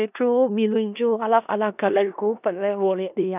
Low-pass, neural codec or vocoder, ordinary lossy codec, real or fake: 3.6 kHz; codec, 16 kHz in and 24 kHz out, 0.4 kbps, LongCat-Audio-Codec, four codebook decoder; none; fake